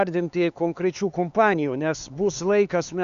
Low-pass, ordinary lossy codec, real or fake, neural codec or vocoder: 7.2 kHz; Opus, 64 kbps; fake; codec, 16 kHz, 4 kbps, X-Codec, HuBERT features, trained on LibriSpeech